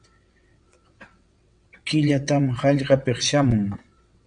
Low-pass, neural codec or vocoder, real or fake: 9.9 kHz; vocoder, 22.05 kHz, 80 mel bands, WaveNeXt; fake